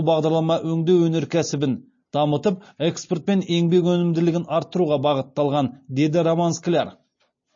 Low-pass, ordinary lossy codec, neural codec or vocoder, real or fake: 7.2 kHz; MP3, 32 kbps; none; real